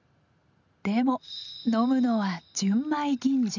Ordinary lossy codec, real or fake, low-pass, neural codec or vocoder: none; real; 7.2 kHz; none